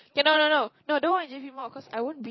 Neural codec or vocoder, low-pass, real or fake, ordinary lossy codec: vocoder, 44.1 kHz, 128 mel bands every 512 samples, BigVGAN v2; 7.2 kHz; fake; MP3, 24 kbps